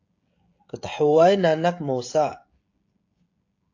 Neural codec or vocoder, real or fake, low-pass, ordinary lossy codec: codec, 16 kHz, 16 kbps, FreqCodec, smaller model; fake; 7.2 kHz; AAC, 48 kbps